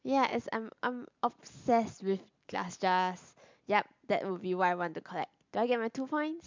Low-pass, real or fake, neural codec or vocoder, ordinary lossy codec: 7.2 kHz; real; none; MP3, 64 kbps